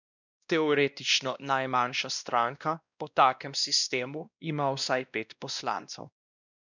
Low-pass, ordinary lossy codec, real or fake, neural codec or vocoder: 7.2 kHz; none; fake; codec, 16 kHz, 1 kbps, X-Codec, WavLM features, trained on Multilingual LibriSpeech